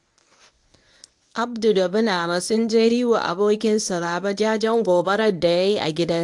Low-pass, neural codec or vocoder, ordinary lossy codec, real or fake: 10.8 kHz; codec, 24 kHz, 0.9 kbps, WavTokenizer, medium speech release version 1; AAC, 64 kbps; fake